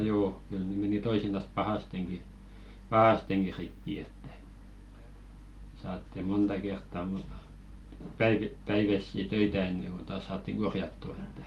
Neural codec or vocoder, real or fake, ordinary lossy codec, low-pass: none; real; Opus, 24 kbps; 19.8 kHz